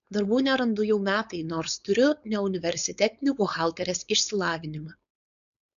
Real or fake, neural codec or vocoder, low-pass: fake; codec, 16 kHz, 4.8 kbps, FACodec; 7.2 kHz